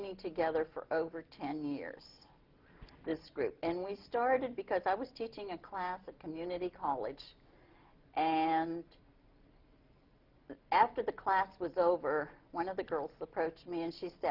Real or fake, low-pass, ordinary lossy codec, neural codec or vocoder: real; 5.4 kHz; Opus, 32 kbps; none